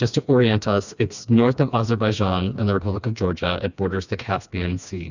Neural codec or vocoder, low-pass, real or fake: codec, 16 kHz, 2 kbps, FreqCodec, smaller model; 7.2 kHz; fake